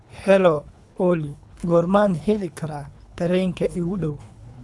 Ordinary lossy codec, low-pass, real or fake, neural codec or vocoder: none; none; fake; codec, 24 kHz, 3 kbps, HILCodec